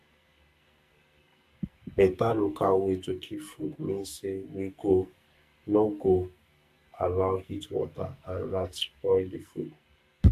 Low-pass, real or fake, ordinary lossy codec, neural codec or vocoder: 14.4 kHz; fake; MP3, 64 kbps; codec, 44.1 kHz, 2.6 kbps, SNAC